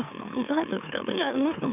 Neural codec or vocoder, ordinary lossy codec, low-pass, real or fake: autoencoder, 44.1 kHz, a latent of 192 numbers a frame, MeloTTS; none; 3.6 kHz; fake